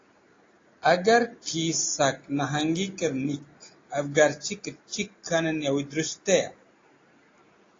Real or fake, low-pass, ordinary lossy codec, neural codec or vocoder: real; 7.2 kHz; AAC, 32 kbps; none